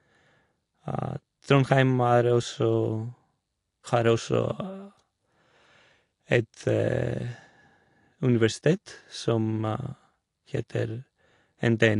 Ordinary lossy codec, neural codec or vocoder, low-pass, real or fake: AAC, 48 kbps; none; 10.8 kHz; real